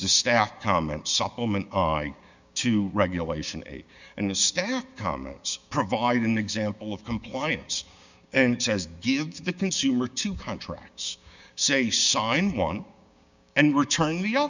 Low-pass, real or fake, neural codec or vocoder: 7.2 kHz; fake; codec, 16 kHz, 6 kbps, DAC